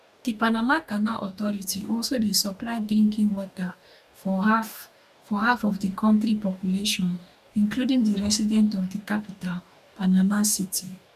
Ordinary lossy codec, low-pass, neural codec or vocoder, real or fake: none; 14.4 kHz; codec, 44.1 kHz, 2.6 kbps, DAC; fake